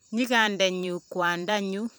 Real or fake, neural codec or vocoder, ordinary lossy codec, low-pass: fake; vocoder, 44.1 kHz, 128 mel bands every 512 samples, BigVGAN v2; none; none